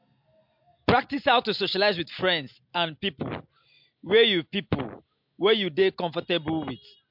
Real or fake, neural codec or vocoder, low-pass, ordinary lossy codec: real; none; 5.4 kHz; MP3, 48 kbps